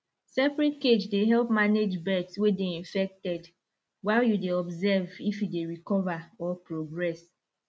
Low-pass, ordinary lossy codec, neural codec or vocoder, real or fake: none; none; none; real